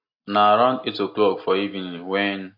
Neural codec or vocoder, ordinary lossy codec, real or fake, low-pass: none; MP3, 32 kbps; real; 5.4 kHz